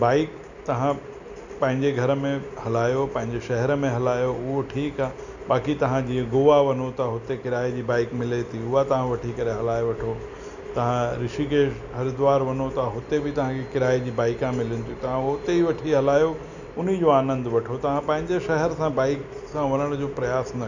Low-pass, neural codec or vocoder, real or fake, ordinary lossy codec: 7.2 kHz; none; real; none